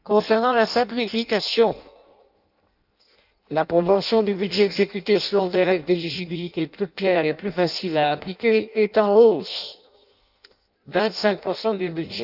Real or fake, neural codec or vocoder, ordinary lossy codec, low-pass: fake; codec, 16 kHz in and 24 kHz out, 0.6 kbps, FireRedTTS-2 codec; none; 5.4 kHz